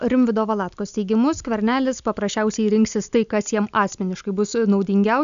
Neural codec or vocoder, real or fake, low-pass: none; real; 7.2 kHz